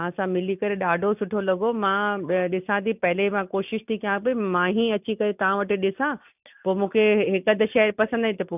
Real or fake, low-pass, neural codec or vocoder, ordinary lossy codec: real; 3.6 kHz; none; none